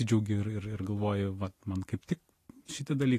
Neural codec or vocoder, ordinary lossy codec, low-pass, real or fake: none; AAC, 48 kbps; 14.4 kHz; real